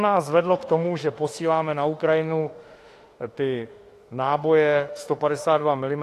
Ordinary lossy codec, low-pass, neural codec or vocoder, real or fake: AAC, 48 kbps; 14.4 kHz; autoencoder, 48 kHz, 32 numbers a frame, DAC-VAE, trained on Japanese speech; fake